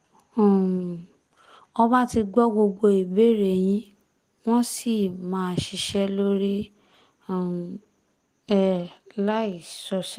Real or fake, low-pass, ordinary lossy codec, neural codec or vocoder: real; 14.4 kHz; Opus, 24 kbps; none